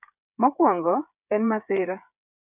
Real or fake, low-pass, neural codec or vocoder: fake; 3.6 kHz; codec, 16 kHz, 8 kbps, FreqCodec, smaller model